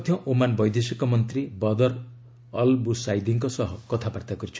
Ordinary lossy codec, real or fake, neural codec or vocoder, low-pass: none; real; none; none